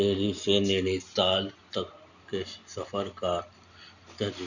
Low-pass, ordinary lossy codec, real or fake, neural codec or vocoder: 7.2 kHz; none; real; none